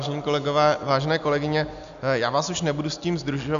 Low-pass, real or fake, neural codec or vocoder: 7.2 kHz; real; none